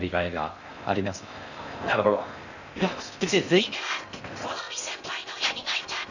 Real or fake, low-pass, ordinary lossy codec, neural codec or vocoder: fake; 7.2 kHz; none; codec, 16 kHz in and 24 kHz out, 0.6 kbps, FocalCodec, streaming, 4096 codes